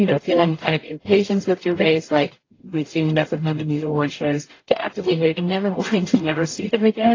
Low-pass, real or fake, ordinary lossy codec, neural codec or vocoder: 7.2 kHz; fake; AAC, 32 kbps; codec, 44.1 kHz, 0.9 kbps, DAC